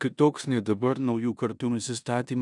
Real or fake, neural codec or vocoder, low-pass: fake; codec, 16 kHz in and 24 kHz out, 0.9 kbps, LongCat-Audio-Codec, four codebook decoder; 10.8 kHz